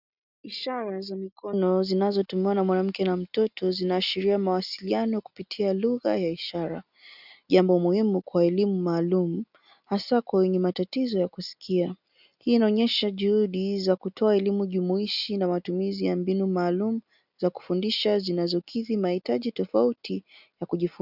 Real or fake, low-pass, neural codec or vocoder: real; 5.4 kHz; none